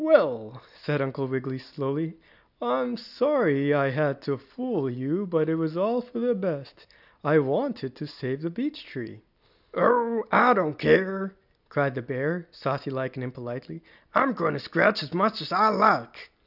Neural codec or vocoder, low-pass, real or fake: none; 5.4 kHz; real